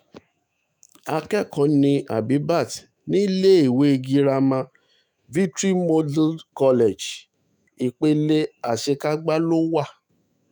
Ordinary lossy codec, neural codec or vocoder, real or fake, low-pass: none; autoencoder, 48 kHz, 128 numbers a frame, DAC-VAE, trained on Japanese speech; fake; none